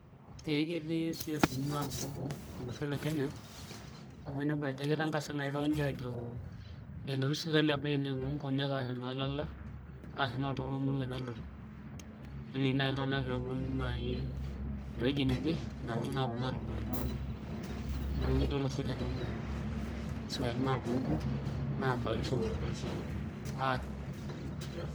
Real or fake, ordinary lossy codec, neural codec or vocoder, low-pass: fake; none; codec, 44.1 kHz, 1.7 kbps, Pupu-Codec; none